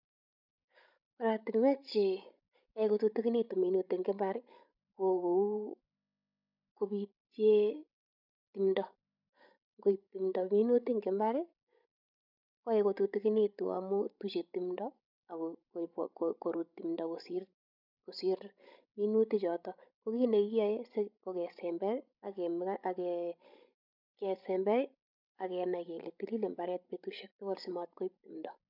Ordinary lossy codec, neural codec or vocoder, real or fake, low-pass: none; codec, 16 kHz, 16 kbps, FreqCodec, larger model; fake; 5.4 kHz